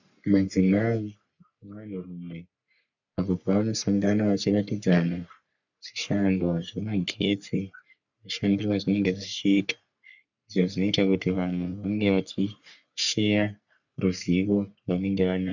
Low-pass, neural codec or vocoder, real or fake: 7.2 kHz; codec, 44.1 kHz, 3.4 kbps, Pupu-Codec; fake